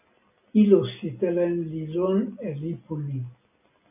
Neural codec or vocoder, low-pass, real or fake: none; 3.6 kHz; real